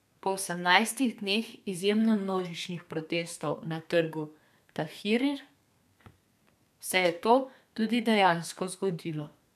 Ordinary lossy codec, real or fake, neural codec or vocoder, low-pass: none; fake; codec, 32 kHz, 1.9 kbps, SNAC; 14.4 kHz